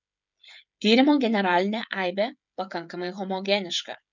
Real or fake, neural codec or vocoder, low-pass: fake; codec, 16 kHz, 16 kbps, FreqCodec, smaller model; 7.2 kHz